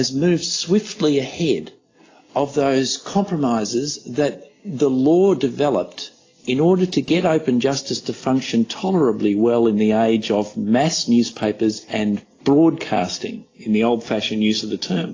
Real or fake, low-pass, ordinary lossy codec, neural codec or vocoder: real; 7.2 kHz; AAC, 32 kbps; none